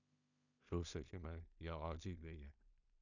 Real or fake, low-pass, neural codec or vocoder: fake; 7.2 kHz; codec, 16 kHz in and 24 kHz out, 0.4 kbps, LongCat-Audio-Codec, two codebook decoder